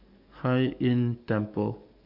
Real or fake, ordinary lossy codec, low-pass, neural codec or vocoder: fake; none; 5.4 kHz; codec, 44.1 kHz, 7.8 kbps, DAC